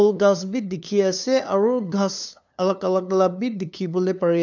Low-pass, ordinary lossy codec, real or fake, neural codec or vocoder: 7.2 kHz; none; fake; codec, 16 kHz, 2 kbps, FunCodec, trained on LibriTTS, 25 frames a second